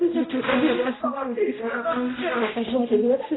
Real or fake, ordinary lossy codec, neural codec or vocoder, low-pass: fake; AAC, 16 kbps; codec, 16 kHz, 0.5 kbps, X-Codec, HuBERT features, trained on general audio; 7.2 kHz